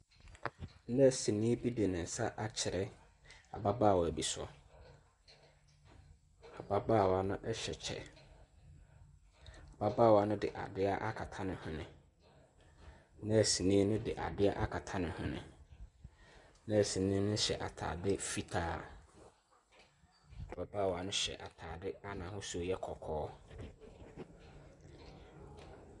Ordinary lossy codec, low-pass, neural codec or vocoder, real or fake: MP3, 96 kbps; 10.8 kHz; none; real